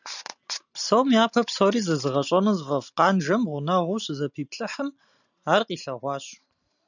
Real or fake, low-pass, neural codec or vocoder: real; 7.2 kHz; none